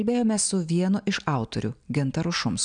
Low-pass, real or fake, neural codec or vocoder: 9.9 kHz; real; none